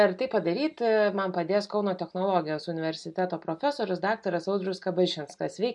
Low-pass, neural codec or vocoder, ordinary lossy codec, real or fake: 10.8 kHz; none; MP3, 64 kbps; real